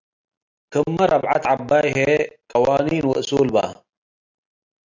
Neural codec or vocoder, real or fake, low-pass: none; real; 7.2 kHz